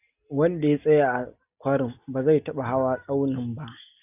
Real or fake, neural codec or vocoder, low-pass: real; none; 3.6 kHz